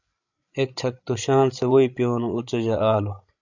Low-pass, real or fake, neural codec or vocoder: 7.2 kHz; fake; codec, 16 kHz, 16 kbps, FreqCodec, larger model